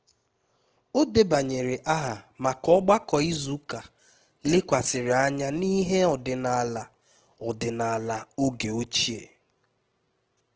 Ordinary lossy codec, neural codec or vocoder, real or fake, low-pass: Opus, 16 kbps; none; real; 7.2 kHz